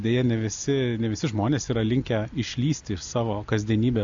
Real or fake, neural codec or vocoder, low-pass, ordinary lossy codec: real; none; 7.2 kHz; AAC, 64 kbps